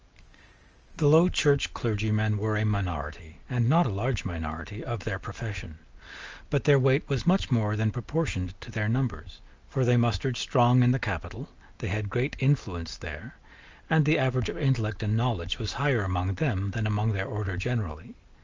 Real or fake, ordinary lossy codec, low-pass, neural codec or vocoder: real; Opus, 24 kbps; 7.2 kHz; none